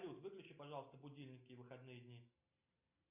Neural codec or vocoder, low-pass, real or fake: none; 3.6 kHz; real